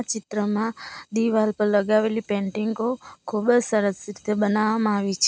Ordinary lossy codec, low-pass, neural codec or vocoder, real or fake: none; none; none; real